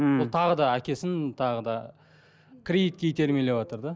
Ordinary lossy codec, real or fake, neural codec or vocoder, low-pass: none; real; none; none